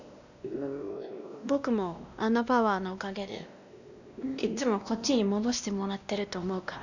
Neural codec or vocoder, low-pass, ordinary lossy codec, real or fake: codec, 16 kHz, 1 kbps, X-Codec, WavLM features, trained on Multilingual LibriSpeech; 7.2 kHz; none; fake